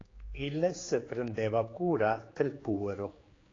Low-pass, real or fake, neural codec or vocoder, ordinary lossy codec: 7.2 kHz; fake; codec, 16 kHz, 4 kbps, X-Codec, HuBERT features, trained on general audio; AAC, 32 kbps